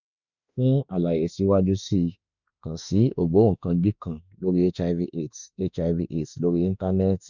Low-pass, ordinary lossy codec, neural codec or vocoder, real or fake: 7.2 kHz; none; autoencoder, 48 kHz, 32 numbers a frame, DAC-VAE, trained on Japanese speech; fake